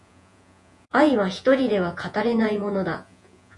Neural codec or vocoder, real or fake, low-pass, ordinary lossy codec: vocoder, 48 kHz, 128 mel bands, Vocos; fake; 10.8 kHz; MP3, 64 kbps